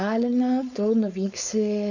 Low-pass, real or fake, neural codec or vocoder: 7.2 kHz; fake; codec, 16 kHz, 4.8 kbps, FACodec